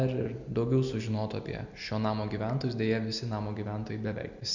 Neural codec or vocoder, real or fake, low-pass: none; real; 7.2 kHz